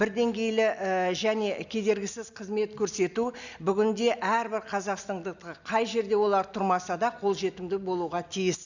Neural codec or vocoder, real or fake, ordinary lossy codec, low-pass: none; real; none; 7.2 kHz